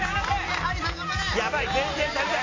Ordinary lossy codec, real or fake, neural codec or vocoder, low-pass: AAC, 32 kbps; real; none; 7.2 kHz